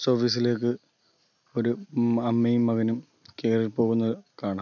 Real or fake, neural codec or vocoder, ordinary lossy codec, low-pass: real; none; none; 7.2 kHz